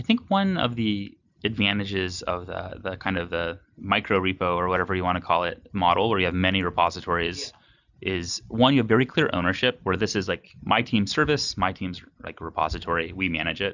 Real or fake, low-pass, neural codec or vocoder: real; 7.2 kHz; none